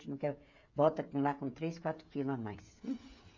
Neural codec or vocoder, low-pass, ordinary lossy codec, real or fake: codec, 16 kHz, 16 kbps, FreqCodec, smaller model; 7.2 kHz; MP3, 48 kbps; fake